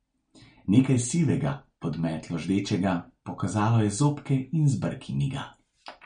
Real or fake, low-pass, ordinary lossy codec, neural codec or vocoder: real; 9.9 kHz; MP3, 48 kbps; none